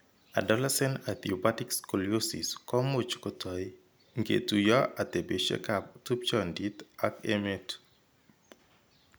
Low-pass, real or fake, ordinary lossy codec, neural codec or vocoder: none; real; none; none